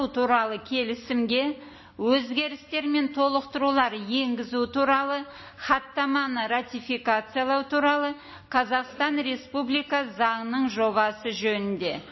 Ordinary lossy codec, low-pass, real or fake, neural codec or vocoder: MP3, 24 kbps; 7.2 kHz; real; none